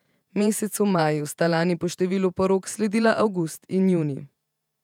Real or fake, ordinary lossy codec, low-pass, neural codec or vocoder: fake; none; 19.8 kHz; vocoder, 48 kHz, 128 mel bands, Vocos